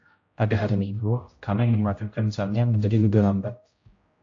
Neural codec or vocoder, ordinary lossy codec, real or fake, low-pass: codec, 16 kHz, 0.5 kbps, X-Codec, HuBERT features, trained on general audio; AAC, 48 kbps; fake; 7.2 kHz